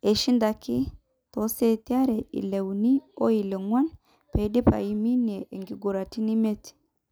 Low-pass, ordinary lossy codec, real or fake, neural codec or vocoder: none; none; real; none